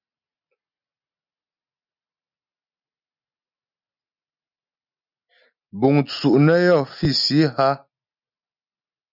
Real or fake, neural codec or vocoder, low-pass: real; none; 5.4 kHz